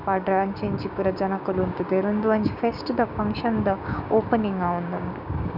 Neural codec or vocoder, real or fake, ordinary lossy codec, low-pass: codec, 16 kHz, 6 kbps, DAC; fake; none; 5.4 kHz